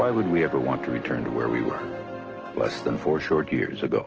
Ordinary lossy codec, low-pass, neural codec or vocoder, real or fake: Opus, 16 kbps; 7.2 kHz; none; real